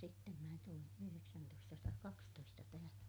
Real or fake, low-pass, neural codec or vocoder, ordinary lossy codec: real; none; none; none